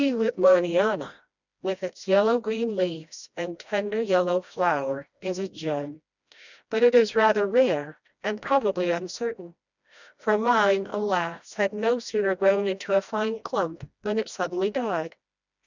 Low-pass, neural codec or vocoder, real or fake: 7.2 kHz; codec, 16 kHz, 1 kbps, FreqCodec, smaller model; fake